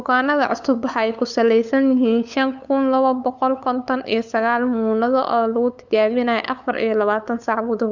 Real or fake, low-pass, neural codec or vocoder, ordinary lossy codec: fake; 7.2 kHz; codec, 16 kHz, 8 kbps, FunCodec, trained on LibriTTS, 25 frames a second; none